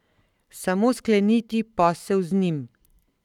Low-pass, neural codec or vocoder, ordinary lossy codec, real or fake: 19.8 kHz; codec, 44.1 kHz, 7.8 kbps, Pupu-Codec; none; fake